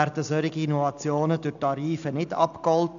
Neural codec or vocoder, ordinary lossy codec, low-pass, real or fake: none; none; 7.2 kHz; real